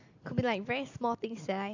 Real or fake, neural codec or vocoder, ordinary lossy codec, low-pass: real; none; AAC, 48 kbps; 7.2 kHz